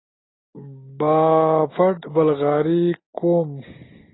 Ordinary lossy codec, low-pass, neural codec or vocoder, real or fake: AAC, 16 kbps; 7.2 kHz; none; real